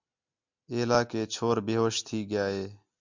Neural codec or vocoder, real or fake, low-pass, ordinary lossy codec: none; real; 7.2 kHz; MP3, 64 kbps